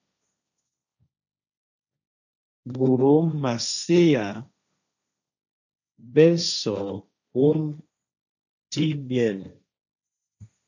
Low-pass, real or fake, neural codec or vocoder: 7.2 kHz; fake; codec, 16 kHz, 1.1 kbps, Voila-Tokenizer